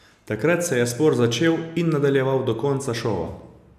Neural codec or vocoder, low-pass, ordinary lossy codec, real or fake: none; 14.4 kHz; none; real